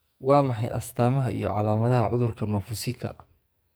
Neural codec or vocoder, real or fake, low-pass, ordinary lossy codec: codec, 44.1 kHz, 2.6 kbps, SNAC; fake; none; none